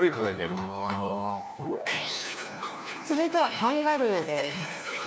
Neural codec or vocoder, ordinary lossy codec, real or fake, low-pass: codec, 16 kHz, 1 kbps, FunCodec, trained on LibriTTS, 50 frames a second; none; fake; none